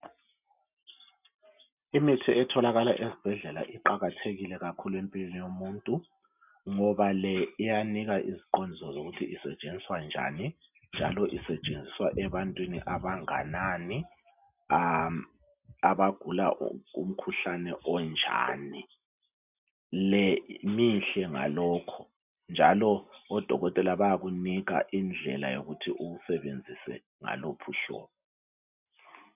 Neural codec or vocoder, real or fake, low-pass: none; real; 3.6 kHz